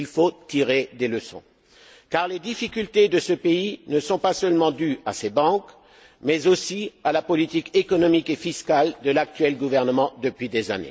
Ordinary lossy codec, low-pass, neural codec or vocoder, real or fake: none; none; none; real